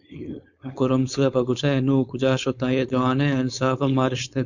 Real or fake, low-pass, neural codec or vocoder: fake; 7.2 kHz; codec, 16 kHz, 4.8 kbps, FACodec